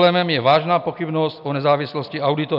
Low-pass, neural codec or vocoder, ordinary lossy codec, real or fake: 5.4 kHz; none; AAC, 48 kbps; real